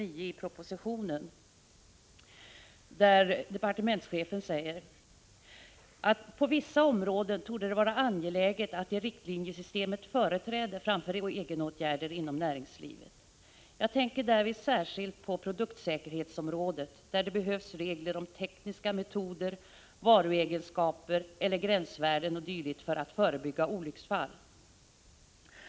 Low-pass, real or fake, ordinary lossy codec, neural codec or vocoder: none; real; none; none